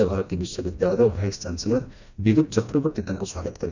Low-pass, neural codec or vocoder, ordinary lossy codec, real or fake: 7.2 kHz; codec, 16 kHz, 1 kbps, FreqCodec, smaller model; none; fake